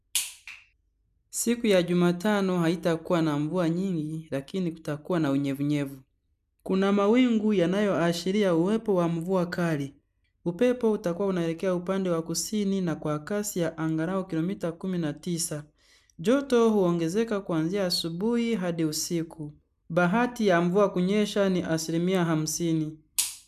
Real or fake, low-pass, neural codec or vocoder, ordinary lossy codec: real; 14.4 kHz; none; none